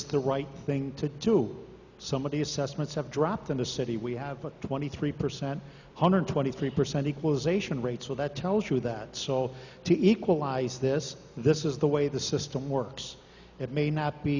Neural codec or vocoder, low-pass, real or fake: none; 7.2 kHz; real